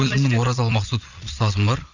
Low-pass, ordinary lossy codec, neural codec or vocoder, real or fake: 7.2 kHz; none; vocoder, 22.05 kHz, 80 mel bands, Vocos; fake